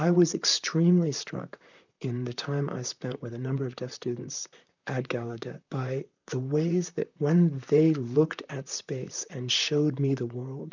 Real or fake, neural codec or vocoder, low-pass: fake; vocoder, 44.1 kHz, 128 mel bands, Pupu-Vocoder; 7.2 kHz